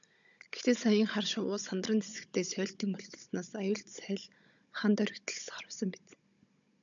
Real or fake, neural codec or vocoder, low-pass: fake; codec, 16 kHz, 16 kbps, FunCodec, trained on Chinese and English, 50 frames a second; 7.2 kHz